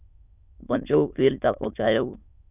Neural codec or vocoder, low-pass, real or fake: autoencoder, 22.05 kHz, a latent of 192 numbers a frame, VITS, trained on many speakers; 3.6 kHz; fake